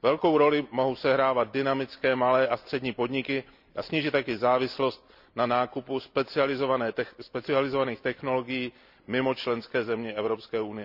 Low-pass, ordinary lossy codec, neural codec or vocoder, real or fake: 5.4 kHz; none; none; real